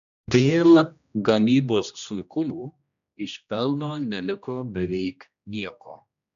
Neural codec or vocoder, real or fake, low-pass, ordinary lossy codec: codec, 16 kHz, 1 kbps, X-Codec, HuBERT features, trained on general audio; fake; 7.2 kHz; AAC, 96 kbps